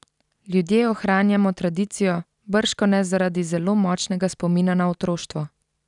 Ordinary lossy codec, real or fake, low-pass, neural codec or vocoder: none; real; 10.8 kHz; none